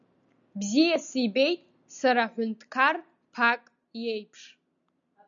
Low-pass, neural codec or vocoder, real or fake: 7.2 kHz; none; real